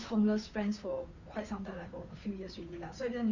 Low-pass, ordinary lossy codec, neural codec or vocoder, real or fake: 7.2 kHz; none; codec, 16 kHz, 2 kbps, FunCodec, trained on Chinese and English, 25 frames a second; fake